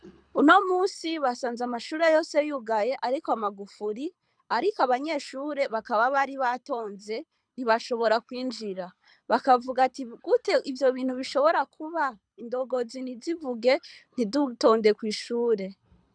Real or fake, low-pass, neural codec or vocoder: fake; 9.9 kHz; codec, 24 kHz, 6 kbps, HILCodec